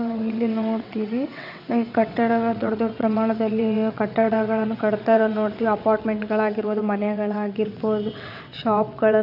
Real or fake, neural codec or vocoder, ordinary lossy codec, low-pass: fake; vocoder, 22.05 kHz, 80 mel bands, WaveNeXt; none; 5.4 kHz